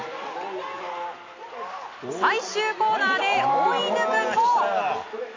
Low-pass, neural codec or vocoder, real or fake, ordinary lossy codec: 7.2 kHz; none; real; AAC, 32 kbps